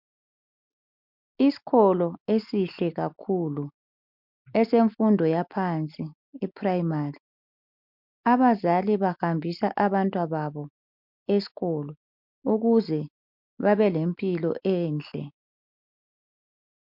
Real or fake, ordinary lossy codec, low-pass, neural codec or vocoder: real; MP3, 48 kbps; 5.4 kHz; none